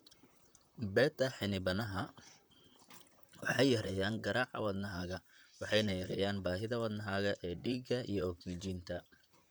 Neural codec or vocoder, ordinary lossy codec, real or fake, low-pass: vocoder, 44.1 kHz, 128 mel bands, Pupu-Vocoder; none; fake; none